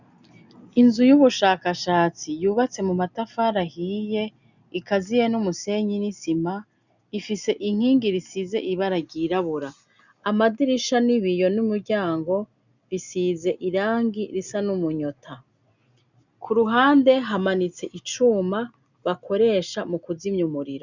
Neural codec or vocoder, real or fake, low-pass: none; real; 7.2 kHz